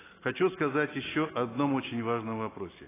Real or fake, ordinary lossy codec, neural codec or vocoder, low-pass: real; AAC, 16 kbps; none; 3.6 kHz